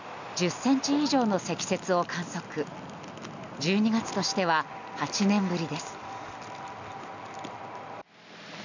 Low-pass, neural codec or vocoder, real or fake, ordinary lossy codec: 7.2 kHz; none; real; none